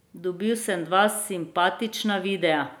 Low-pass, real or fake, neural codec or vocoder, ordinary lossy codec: none; real; none; none